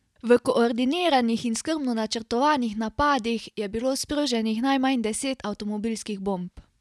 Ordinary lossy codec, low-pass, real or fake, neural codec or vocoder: none; none; real; none